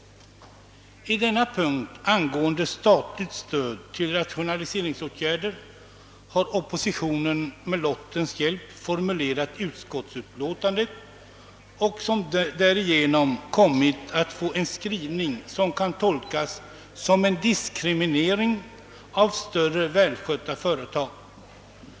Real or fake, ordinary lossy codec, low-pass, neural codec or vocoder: real; none; none; none